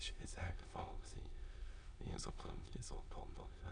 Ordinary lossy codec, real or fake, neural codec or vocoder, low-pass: none; fake; autoencoder, 22.05 kHz, a latent of 192 numbers a frame, VITS, trained on many speakers; 9.9 kHz